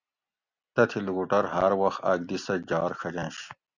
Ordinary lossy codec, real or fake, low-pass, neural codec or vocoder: Opus, 64 kbps; real; 7.2 kHz; none